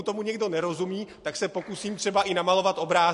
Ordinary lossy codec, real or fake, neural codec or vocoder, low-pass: MP3, 48 kbps; real; none; 14.4 kHz